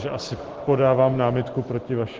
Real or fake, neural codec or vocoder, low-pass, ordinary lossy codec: real; none; 7.2 kHz; Opus, 16 kbps